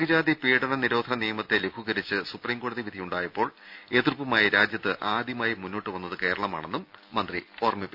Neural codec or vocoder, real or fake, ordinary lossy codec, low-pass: none; real; none; 5.4 kHz